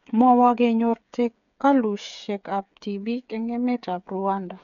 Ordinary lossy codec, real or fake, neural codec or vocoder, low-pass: none; fake; codec, 16 kHz, 8 kbps, FreqCodec, smaller model; 7.2 kHz